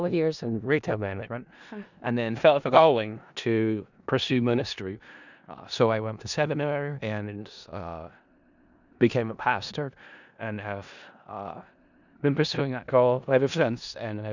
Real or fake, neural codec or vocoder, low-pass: fake; codec, 16 kHz in and 24 kHz out, 0.4 kbps, LongCat-Audio-Codec, four codebook decoder; 7.2 kHz